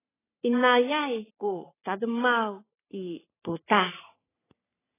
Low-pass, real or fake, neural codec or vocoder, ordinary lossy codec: 3.6 kHz; fake; codec, 44.1 kHz, 3.4 kbps, Pupu-Codec; AAC, 16 kbps